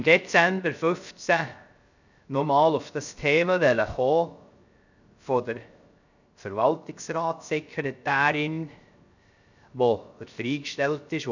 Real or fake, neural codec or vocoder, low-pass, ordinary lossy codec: fake; codec, 16 kHz, 0.3 kbps, FocalCodec; 7.2 kHz; none